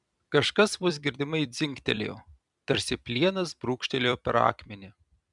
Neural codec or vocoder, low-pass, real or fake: vocoder, 44.1 kHz, 128 mel bands every 256 samples, BigVGAN v2; 10.8 kHz; fake